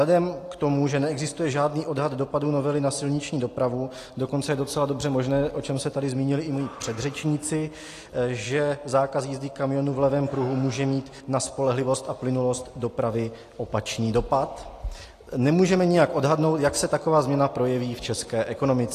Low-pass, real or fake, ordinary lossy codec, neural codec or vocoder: 14.4 kHz; real; AAC, 48 kbps; none